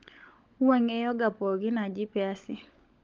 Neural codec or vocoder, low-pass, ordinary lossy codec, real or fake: codec, 16 kHz, 16 kbps, FunCodec, trained on LibriTTS, 50 frames a second; 7.2 kHz; Opus, 32 kbps; fake